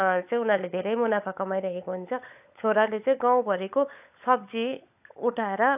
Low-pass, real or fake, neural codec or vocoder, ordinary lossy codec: 3.6 kHz; fake; codec, 16 kHz, 16 kbps, FunCodec, trained on LibriTTS, 50 frames a second; AAC, 32 kbps